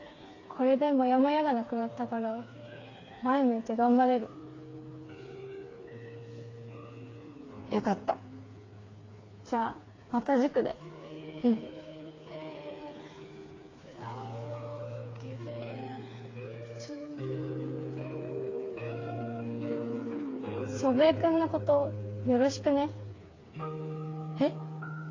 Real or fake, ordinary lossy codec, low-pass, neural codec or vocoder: fake; AAC, 32 kbps; 7.2 kHz; codec, 16 kHz, 4 kbps, FreqCodec, smaller model